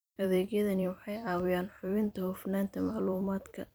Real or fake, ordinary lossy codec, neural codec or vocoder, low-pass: fake; none; vocoder, 44.1 kHz, 128 mel bands every 256 samples, BigVGAN v2; none